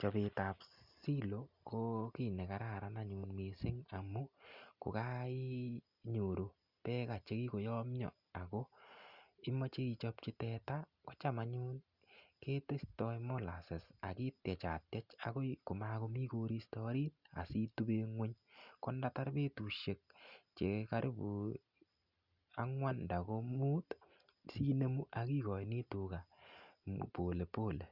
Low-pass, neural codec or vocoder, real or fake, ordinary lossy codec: 5.4 kHz; none; real; Opus, 64 kbps